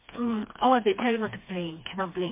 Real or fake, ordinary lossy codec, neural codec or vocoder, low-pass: fake; MP3, 24 kbps; codec, 16 kHz, 2 kbps, FreqCodec, smaller model; 3.6 kHz